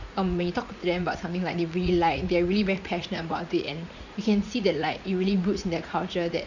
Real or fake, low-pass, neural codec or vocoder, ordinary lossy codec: fake; 7.2 kHz; vocoder, 44.1 kHz, 80 mel bands, Vocos; none